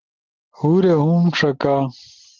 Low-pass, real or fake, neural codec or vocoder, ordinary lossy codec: 7.2 kHz; real; none; Opus, 16 kbps